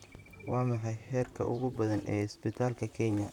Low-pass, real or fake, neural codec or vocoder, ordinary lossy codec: 19.8 kHz; fake; vocoder, 44.1 kHz, 128 mel bands, Pupu-Vocoder; none